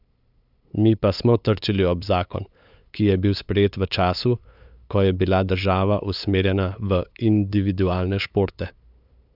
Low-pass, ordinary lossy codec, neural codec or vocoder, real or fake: 5.4 kHz; none; codec, 16 kHz, 8 kbps, FunCodec, trained on LibriTTS, 25 frames a second; fake